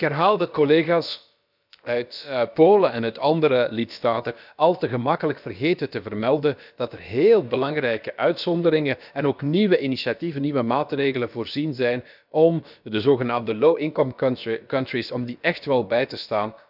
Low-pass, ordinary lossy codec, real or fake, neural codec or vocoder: 5.4 kHz; none; fake; codec, 16 kHz, about 1 kbps, DyCAST, with the encoder's durations